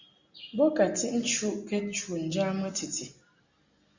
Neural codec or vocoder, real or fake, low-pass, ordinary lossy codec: none; real; 7.2 kHz; Opus, 64 kbps